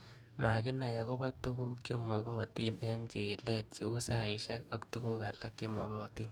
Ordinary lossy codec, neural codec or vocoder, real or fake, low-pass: none; codec, 44.1 kHz, 2.6 kbps, DAC; fake; none